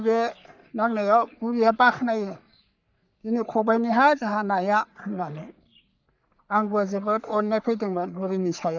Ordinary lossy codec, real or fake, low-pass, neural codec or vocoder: none; fake; 7.2 kHz; codec, 44.1 kHz, 3.4 kbps, Pupu-Codec